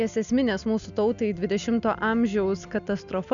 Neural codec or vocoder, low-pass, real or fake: none; 7.2 kHz; real